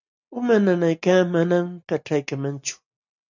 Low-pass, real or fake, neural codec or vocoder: 7.2 kHz; real; none